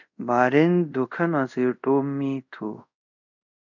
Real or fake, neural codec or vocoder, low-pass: fake; codec, 24 kHz, 0.5 kbps, DualCodec; 7.2 kHz